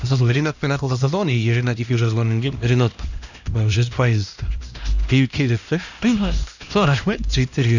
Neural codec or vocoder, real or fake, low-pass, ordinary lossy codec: codec, 16 kHz, 1 kbps, X-Codec, HuBERT features, trained on LibriSpeech; fake; 7.2 kHz; none